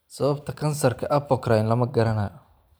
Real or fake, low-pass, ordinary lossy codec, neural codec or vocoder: fake; none; none; vocoder, 44.1 kHz, 128 mel bands every 512 samples, BigVGAN v2